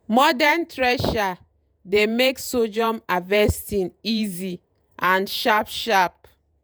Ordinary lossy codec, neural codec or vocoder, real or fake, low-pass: none; vocoder, 48 kHz, 128 mel bands, Vocos; fake; none